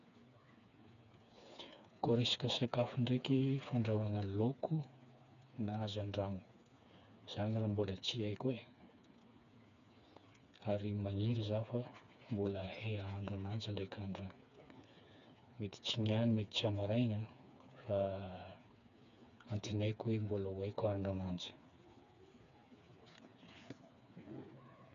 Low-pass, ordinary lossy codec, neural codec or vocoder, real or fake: 7.2 kHz; none; codec, 16 kHz, 4 kbps, FreqCodec, smaller model; fake